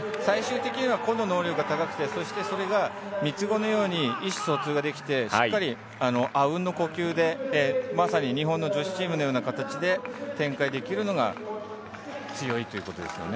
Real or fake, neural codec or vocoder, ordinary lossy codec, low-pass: real; none; none; none